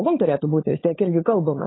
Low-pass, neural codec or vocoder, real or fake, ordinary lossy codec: 7.2 kHz; codec, 16 kHz, 16 kbps, FunCodec, trained on LibriTTS, 50 frames a second; fake; AAC, 16 kbps